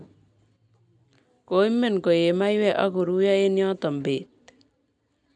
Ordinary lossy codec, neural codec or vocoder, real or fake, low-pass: none; none; real; 9.9 kHz